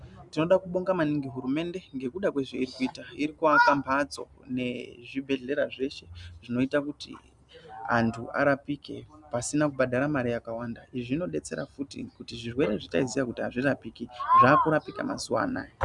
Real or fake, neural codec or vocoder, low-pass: real; none; 10.8 kHz